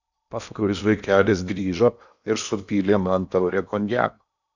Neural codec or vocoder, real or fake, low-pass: codec, 16 kHz in and 24 kHz out, 0.8 kbps, FocalCodec, streaming, 65536 codes; fake; 7.2 kHz